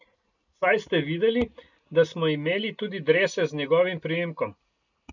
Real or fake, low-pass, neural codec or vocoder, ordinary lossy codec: real; 7.2 kHz; none; none